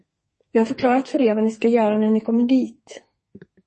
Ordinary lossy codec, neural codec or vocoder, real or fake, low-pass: MP3, 32 kbps; codec, 32 kHz, 1.9 kbps, SNAC; fake; 10.8 kHz